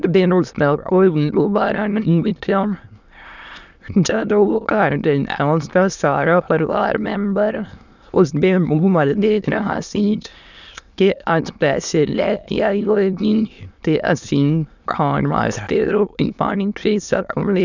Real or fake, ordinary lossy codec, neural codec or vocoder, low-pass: fake; none; autoencoder, 22.05 kHz, a latent of 192 numbers a frame, VITS, trained on many speakers; 7.2 kHz